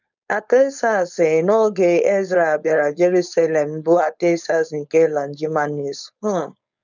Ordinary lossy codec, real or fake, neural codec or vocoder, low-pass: none; fake; codec, 16 kHz, 4.8 kbps, FACodec; 7.2 kHz